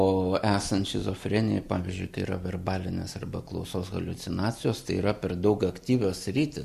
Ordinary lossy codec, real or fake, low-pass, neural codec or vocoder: Opus, 64 kbps; real; 14.4 kHz; none